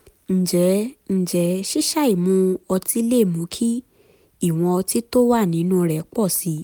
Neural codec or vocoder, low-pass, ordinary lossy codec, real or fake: none; none; none; real